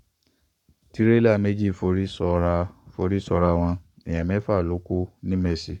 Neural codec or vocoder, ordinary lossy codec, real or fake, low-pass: codec, 44.1 kHz, 7.8 kbps, Pupu-Codec; none; fake; 19.8 kHz